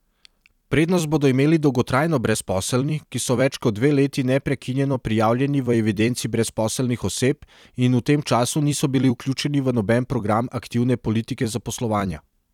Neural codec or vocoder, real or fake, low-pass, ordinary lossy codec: vocoder, 44.1 kHz, 128 mel bands every 256 samples, BigVGAN v2; fake; 19.8 kHz; none